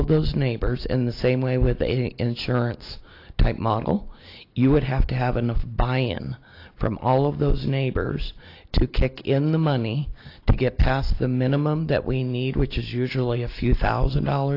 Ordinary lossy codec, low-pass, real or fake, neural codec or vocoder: AAC, 32 kbps; 5.4 kHz; real; none